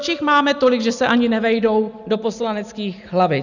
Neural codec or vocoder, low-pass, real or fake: none; 7.2 kHz; real